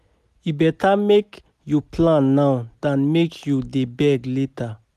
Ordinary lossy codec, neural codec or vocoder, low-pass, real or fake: none; none; 14.4 kHz; real